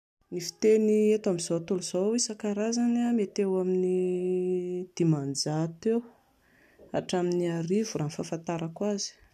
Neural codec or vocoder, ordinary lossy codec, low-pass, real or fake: none; none; 14.4 kHz; real